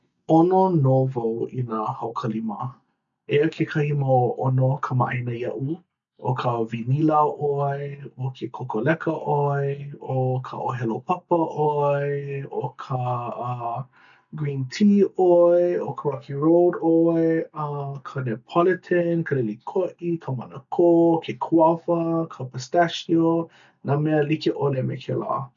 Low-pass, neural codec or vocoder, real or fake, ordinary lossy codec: 7.2 kHz; none; real; none